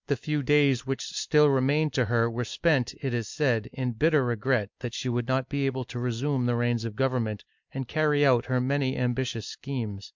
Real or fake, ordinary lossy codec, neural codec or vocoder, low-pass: real; MP3, 64 kbps; none; 7.2 kHz